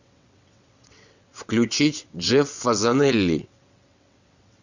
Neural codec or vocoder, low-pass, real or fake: vocoder, 22.05 kHz, 80 mel bands, WaveNeXt; 7.2 kHz; fake